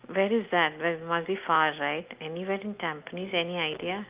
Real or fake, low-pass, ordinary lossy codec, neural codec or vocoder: real; 3.6 kHz; Opus, 32 kbps; none